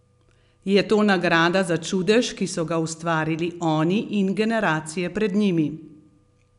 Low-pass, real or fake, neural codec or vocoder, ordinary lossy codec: 10.8 kHz; real; none; none